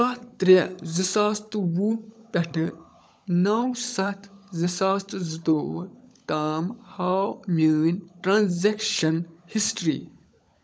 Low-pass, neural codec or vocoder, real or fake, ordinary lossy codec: none; codec, 16 kHz, 16 kbps, FunCodec, trained on LibriTTS, 50 frames a second; fake; none